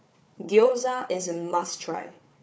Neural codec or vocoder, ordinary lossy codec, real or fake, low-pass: codec, 16 kHz, 4 kbps, FunCodec, trained on Chinese and English, 50 frames a second; none; fake; none